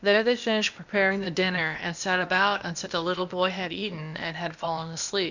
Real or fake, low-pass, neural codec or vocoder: fake; 7.2 kHz; codec, 16 kHz, 0.8 kbps, ZipCodec